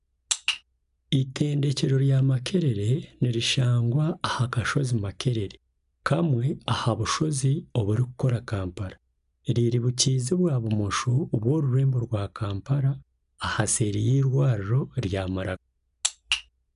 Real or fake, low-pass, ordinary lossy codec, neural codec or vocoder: real; 10.8 kHz; none; none